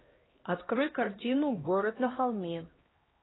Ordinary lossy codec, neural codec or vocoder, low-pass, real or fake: AAC, 16 kbps; codec, 16 kHz, 1 kbps, X-Codec, HuBERT features, trained on LibriSpeech; 7.2 kHz; fake